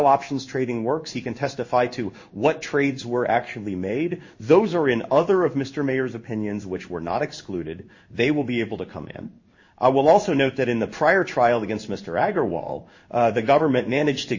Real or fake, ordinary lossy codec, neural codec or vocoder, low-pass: fake; MP3, 32 kbps; codec, 16 kHz in and 24 kHz out, 1 kbps, XY-Tokenizer; 7.2 kHz